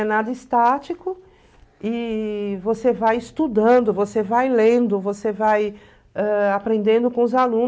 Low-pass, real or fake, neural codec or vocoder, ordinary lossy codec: none; real; none; none